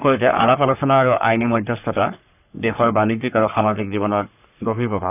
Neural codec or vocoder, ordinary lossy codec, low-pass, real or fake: codec, 44.1 kHz, 3.4 kbps, Pupu-Codec; none; 3.6 kHz; fake